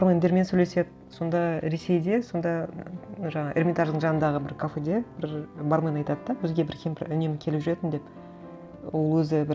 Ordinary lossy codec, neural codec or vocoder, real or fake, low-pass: none; none; real; none